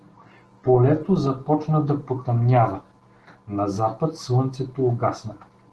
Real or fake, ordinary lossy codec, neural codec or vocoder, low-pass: real; Opus, 16 kbps; none; 10.8 kHz